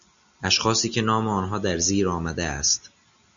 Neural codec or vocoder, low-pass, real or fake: none; 7.2 kHz; real